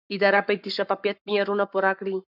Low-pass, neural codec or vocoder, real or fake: 5.4 kHz; codec, 44.1 kHz, 7.8 kbps, Pupu-Codec; fake